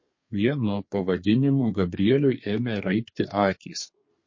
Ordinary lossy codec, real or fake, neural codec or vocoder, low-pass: MP3, 32 kbps; fake; codec, 32 kHz, 1.9 kbps, SNAC; 7.2 kHz